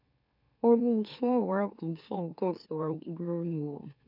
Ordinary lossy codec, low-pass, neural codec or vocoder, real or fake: none; 5.4 kHz; autoencoder, 44.1 kHz, a latent of 192 numbers a frame, MeloTTS; fake